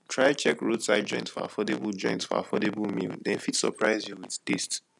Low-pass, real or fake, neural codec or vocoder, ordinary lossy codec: 10.8 kHz; real; none; none